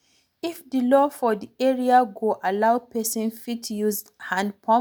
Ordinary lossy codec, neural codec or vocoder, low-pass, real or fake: none; none; none; real